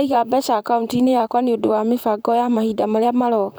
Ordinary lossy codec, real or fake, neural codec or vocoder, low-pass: none; fake; vocoder, 44.1 kHz, 128 mel bands, Pupu-Vocoder; none